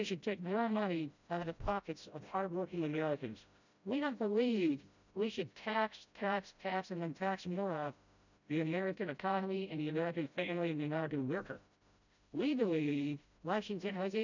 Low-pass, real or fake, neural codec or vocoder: 7.2 kHz; fake; codec, 16 kHz, 0.5 kbps, FreqCodec, smaller model